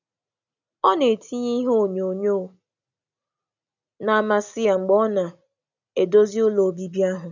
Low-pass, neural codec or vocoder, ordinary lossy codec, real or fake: 7.2 kHz; none; none; real